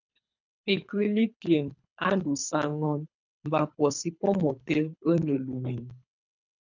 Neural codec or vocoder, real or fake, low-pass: codec, 24 kHz, 3 kbps, HILCodec; fake; 7.2 kHz